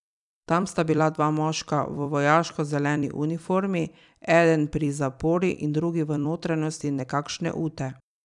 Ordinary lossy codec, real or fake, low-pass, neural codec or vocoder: none; fake; 10.8 kHz; vocoder, 44.1 kHz, 128 mel bands every 256 samples, BigVGAN v2